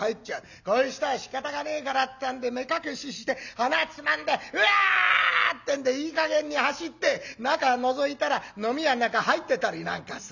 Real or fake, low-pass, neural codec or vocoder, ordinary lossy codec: real; 7.2 kHz; none; none